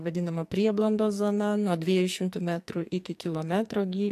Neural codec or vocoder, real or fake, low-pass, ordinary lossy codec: codec, 44.1 kHz, 2.6 kbps, SNAC; fake; 14.4 kHz; AAC, 64 kbps